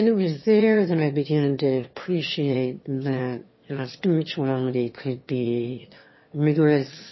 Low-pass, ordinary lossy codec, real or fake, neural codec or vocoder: 7.2 kHz; MP3, 24 kbps; fake; autoencoder, 22.05 kHz, a latent of 192 numbers a frame, VITS, trained on one speaker